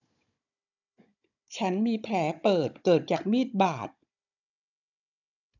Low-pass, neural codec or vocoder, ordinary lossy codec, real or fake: 7.2 kHz; codec, 16 kHz, 16 kbps, FunCodec, trained on Chinese and English, 50 frames a second; none; fake